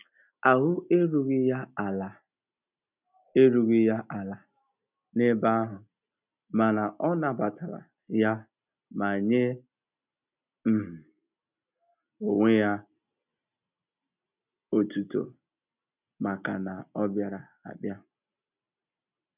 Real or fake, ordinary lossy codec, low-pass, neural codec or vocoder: real; none; 3.6 kHz; none